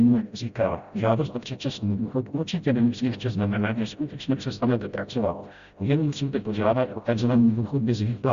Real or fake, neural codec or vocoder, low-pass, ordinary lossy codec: fake; codec, 16 kHz, 0.5 kbps, FreqCodec, smaller model; 7.2 kHz; Opus, 64 kbps